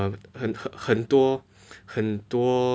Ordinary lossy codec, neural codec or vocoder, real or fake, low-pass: none; none; real; none